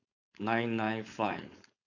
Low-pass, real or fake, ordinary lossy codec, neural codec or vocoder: 7.2 kHz; fake; none; codec, 16 kHz, 4.8 kbps, FACodec